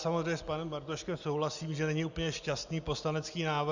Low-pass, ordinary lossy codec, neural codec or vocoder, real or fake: 7.2 kHz; Opus, 64 kbps; none; real